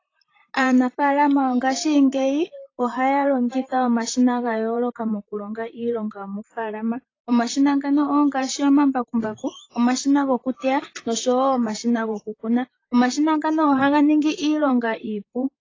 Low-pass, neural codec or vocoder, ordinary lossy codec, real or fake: 7.2 kHz; vocoder, 44.1 kHz, 128 mel bands, Pupu-Vocoder; AAC, 32 kbps; fake